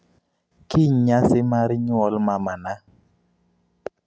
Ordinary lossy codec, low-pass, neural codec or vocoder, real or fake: none; none; none; real